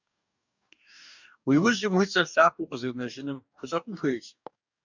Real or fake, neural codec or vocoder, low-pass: fake; codec, 44.1 kHz, 2.6 kbps, DAC; 7.2 kHz